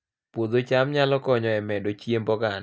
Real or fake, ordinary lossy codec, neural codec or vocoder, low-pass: real; none; none; none